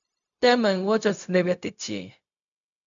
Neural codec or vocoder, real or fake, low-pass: codec, 16 kHz, 0.4 kbps, LongCat-Audio-Codec; fake; 7.2 kHz